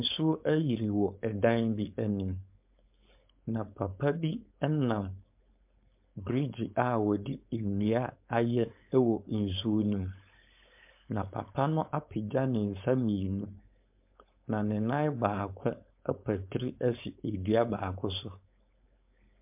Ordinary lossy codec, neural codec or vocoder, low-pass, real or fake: MP3, 32 kbps; codec, 16 kHz, 4.8 kbps, FACodec; 3.6 kHz; fake